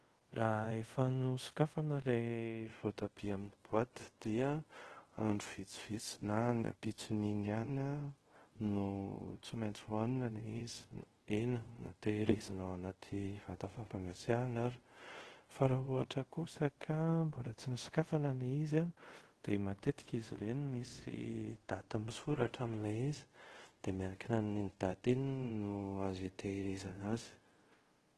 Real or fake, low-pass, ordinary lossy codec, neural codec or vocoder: fake; 10.8 kHz; Opus, 16 kbps; codec, 24 kHz, 0.5 kbps, DualCodec